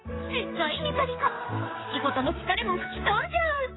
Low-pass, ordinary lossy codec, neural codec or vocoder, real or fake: 7.2 kHz; AAC, 16 kbps; codec, 16 kHz, 4 kbps, X-Codec, HuBERT features, trained on general audio; fake